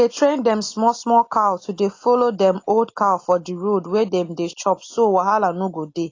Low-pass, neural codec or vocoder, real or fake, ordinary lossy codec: 7.2 kHz; none; real; AAC, 32 kbps